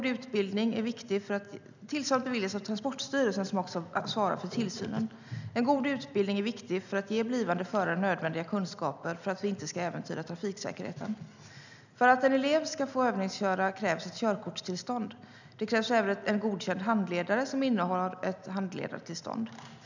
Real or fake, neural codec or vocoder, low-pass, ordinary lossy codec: real; none; 7.2 kHz; none